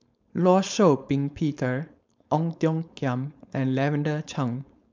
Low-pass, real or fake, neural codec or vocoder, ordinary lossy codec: 7.2 kHz; fake; codec, 16 kHz, 4.8 kbps, FACodec; MP3, 64 kbps